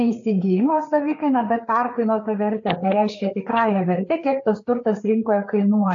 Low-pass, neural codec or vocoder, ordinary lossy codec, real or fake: 7.2 kHz; codec, 16 kHz, 4 kbps, FreqCodec, larger model; AAC, 48 kbps; fake